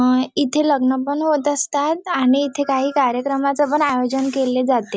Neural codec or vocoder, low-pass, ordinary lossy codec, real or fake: none; none; none; real